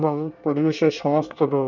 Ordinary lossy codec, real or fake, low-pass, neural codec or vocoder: none; fake; 7.2 kHz; codec, 24 kHz, 1 kbps, SNAC